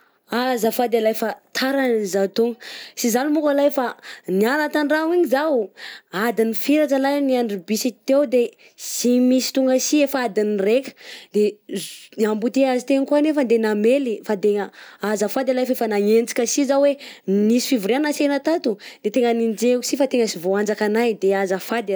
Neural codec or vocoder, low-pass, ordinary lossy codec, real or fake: none; none; none; real